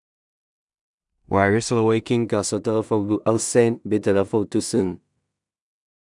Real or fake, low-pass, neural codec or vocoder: fake; 10.8 kHz; codec, 16 kHz in and 24 kHz out, 0.4 kbps, LongCat-Audio-Codec, two codebook decoder